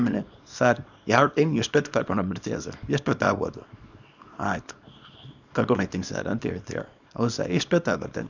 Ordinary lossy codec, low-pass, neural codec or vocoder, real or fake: none; 7.2 kHz; codec, 24 kHz, 0.9 kbps, WavTokenizer, small release; fake